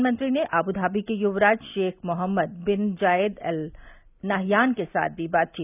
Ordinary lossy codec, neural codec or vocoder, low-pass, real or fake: none; none; 3.6 kHz; real